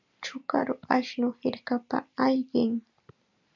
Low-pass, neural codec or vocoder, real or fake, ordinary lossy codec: 7.2 kHz; none; real; MP3, 64 kbps